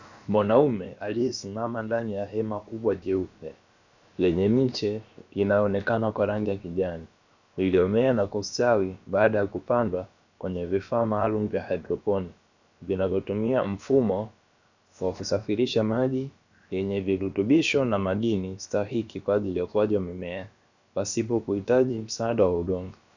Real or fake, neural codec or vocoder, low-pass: fake; codec, 16 kHz, about 1 kbps, DyCAST, with the encoder's durations; 7.2 kHz